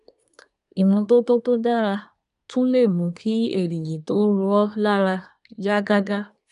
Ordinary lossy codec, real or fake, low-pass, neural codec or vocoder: none; fake; 10.8 kHz; codec, 24 kHz, 1 kbps, SNAC